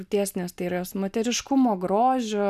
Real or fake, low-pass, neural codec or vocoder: real; 14.4 kHz; none